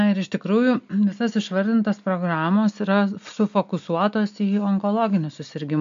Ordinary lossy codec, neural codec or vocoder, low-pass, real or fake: AAC, 64 kbps; none; 7.2 kHz; real